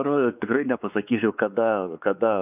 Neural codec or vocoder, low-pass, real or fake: codec, 16 kHz, 4 kbps, X-Codec, WavLM features, trained on Multilingual LibriSpeech; 3.6 kHz; fake